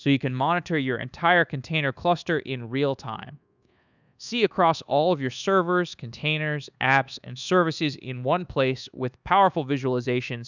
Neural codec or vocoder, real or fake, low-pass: codec, 24 kHz, 1.2 kbps, DualCodec; fake; 7.2 kHz